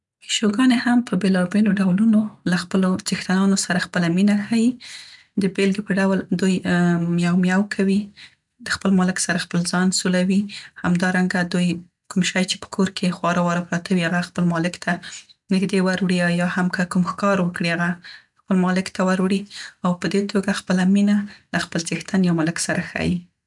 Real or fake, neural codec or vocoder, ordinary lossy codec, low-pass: real; none; MP3, 96 kbps; 10.8 kHz